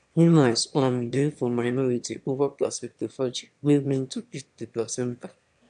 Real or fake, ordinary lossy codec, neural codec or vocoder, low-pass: fake; AAC, 96 kbps; autoencoder, 22.05 kHz, a latent of 192 numbers a frame, VITS, trained on one speaker; 9.9 kHz